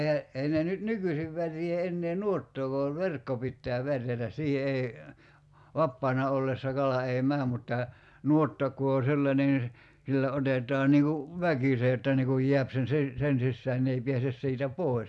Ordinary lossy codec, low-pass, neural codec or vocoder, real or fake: none; none; none; real